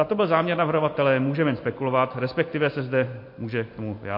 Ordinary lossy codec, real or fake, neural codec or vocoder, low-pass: MP3, 32 kbps; real; none; 5.4 kHz